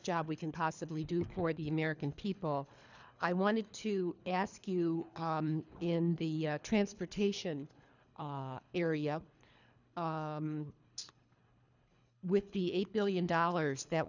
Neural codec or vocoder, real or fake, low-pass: codec, 24 kHz, 3 kbps, HILCodec; fake; 7.2 kHz